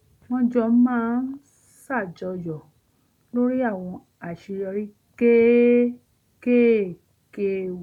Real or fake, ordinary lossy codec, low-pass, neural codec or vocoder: real; none; 19.8 kHz; none